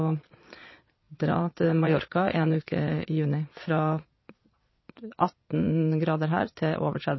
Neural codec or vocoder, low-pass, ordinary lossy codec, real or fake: vocoder, 22.05 kHz, 80 mel bands, Vocos; 7.2 kHz; MP3, 24 kbps; fake